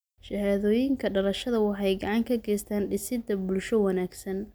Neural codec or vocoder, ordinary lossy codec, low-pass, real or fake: none; none; none; real